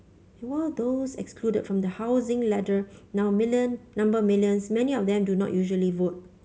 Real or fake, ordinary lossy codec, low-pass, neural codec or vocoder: real; none; none; none